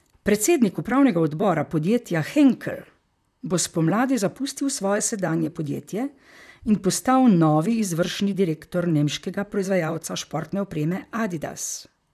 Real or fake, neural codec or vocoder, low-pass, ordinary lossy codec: fake; vocoder, 44.1 kHz, 128 mel bands, Pupu-Vocoder; 14.4 kHz; none